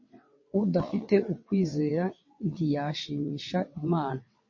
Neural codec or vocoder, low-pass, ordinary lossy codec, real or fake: vocoder, 22.05 kHz, 80 mel bands, WaveNeXt; 7.2 kHz; MP3, 32 kbps; fake